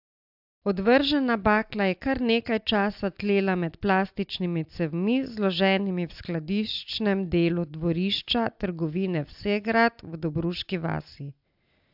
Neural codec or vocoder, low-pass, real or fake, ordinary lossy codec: none; 5.4 kHz; real; AAC, 48 kbps